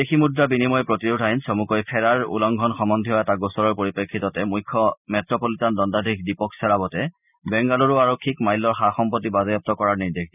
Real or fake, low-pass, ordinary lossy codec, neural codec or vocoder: real; 3.6 kHz; none; none